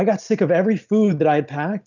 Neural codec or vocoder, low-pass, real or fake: none; 7.2 kHz; real